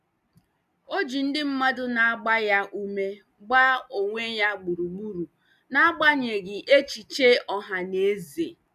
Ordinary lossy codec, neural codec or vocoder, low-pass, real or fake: none; none; 14.4 kHz; real